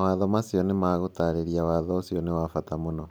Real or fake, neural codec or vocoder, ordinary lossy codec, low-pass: real; none; none; none